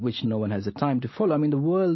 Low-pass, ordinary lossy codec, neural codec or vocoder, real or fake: 7.2 kHz; MP3, 24 kbps; none; real